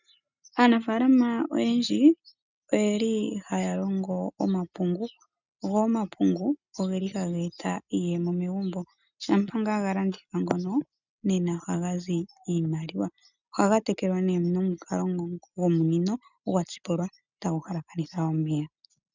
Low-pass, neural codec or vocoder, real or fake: 7.2 kHz; none; real